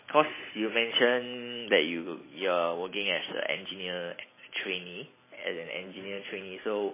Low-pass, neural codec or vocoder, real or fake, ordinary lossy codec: 3.6 kHz; none; real; MP3, 16 kbps